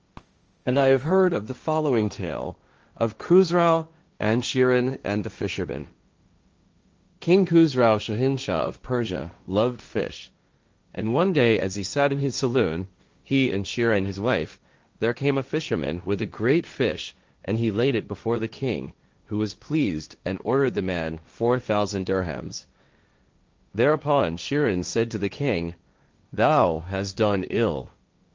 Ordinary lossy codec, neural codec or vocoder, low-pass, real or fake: Opus, 24 kbps; codec, 16 kHz, 1.1 kbps, Voila-Tokenizer; 7.2 kHz; fake